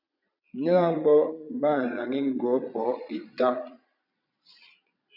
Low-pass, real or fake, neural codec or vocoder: 5.4 kHz; fake; vocoder, 22.05 kHz, 80 mel bands, Vocos